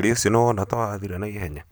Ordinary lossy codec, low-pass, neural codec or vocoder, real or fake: none; none; none; real